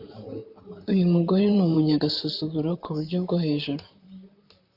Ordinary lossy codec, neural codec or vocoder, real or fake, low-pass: AAC, 48 kbps; vocoder, 44.1 kHz, 128 mel bands, Pupu-Vocoder; fake; 5.4 kHz